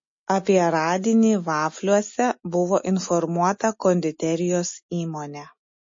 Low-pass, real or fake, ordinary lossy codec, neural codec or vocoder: 7.2 kHz; real; MP3, 32 kbps; none